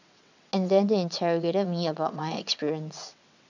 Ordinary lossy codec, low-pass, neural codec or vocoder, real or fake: none; 7.2 kHz; vocoder, 22.05 kHz, 80 mel bands, WaveNeXt; fake